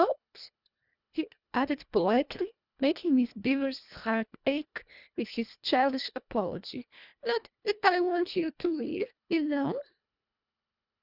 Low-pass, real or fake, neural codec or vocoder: 5.4 kHz; fake; codec, 24 kHz, 1.5 kbps, HILCodec